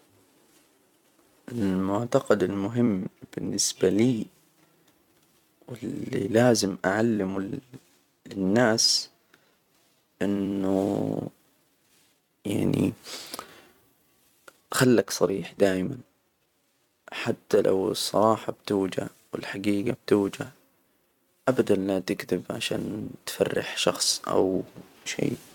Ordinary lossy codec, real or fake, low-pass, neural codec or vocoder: none; fake; 19.8 kHz; vocoder, 44.1 kHz, 128 mel bands every 256 samples, BigVGAN v2